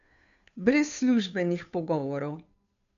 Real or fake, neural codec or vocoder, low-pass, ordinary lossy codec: fake; codec, 16 kHz, 2 kbps, FunCodec, trained on Chinese and English, 25 frames a second; 7.2 kHz; none